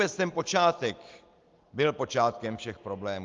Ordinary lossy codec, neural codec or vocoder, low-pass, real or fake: Opus, 32 kbps; none; 7.2 kHz; real